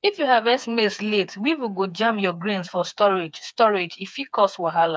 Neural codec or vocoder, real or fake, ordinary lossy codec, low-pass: codec, 16 kHz, 4 kbps, FreqCodec, smaller model; fake; none; none